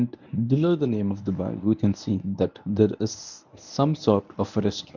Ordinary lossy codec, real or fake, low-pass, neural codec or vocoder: none; fake; 7.2 kHz; codec, 24 kHz, 0.9 kbps, WavTokenizer, medium speech release version 1